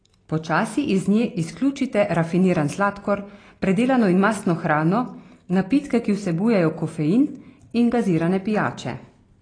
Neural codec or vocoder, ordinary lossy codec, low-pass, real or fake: none; AAC, 32 kbps; 9.9 kHz; real